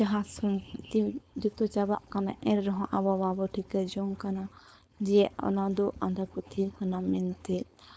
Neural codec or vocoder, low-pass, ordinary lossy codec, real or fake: codec, 16 kHz, 4.8 kbps, FACodec; none; none; fake